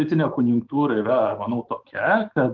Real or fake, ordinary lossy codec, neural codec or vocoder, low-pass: fake; Opus, 16 kbps; autoencoder, 48 kHz, 128 numbers a frame, DAC-VAE, trained on Japanese speech; 7.2 kHz